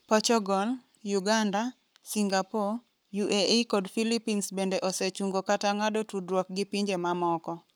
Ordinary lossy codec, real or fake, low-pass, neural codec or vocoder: none; fake; none; codec, 44.1 kHz, 7.8 kbps, Pupu-Codec